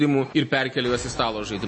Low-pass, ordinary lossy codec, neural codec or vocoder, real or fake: 9.9 kHz; MP3, 32 kbps; none; real